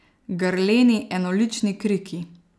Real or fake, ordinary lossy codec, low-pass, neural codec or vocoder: real; none; none; none